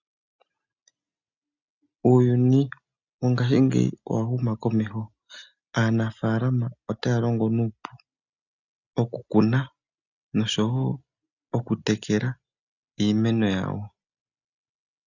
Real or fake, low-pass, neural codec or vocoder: real; 7.2 kHz; none